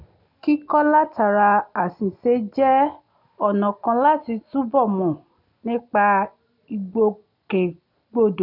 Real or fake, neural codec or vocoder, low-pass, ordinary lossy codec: real; none; 5.4 kHz; none